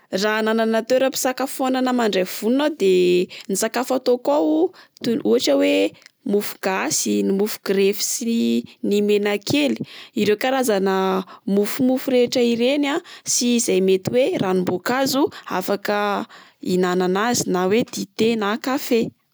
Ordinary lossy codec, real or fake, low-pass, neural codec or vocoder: none; real; none; none